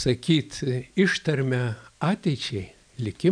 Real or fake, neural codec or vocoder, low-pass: real; none; 9.9 kHz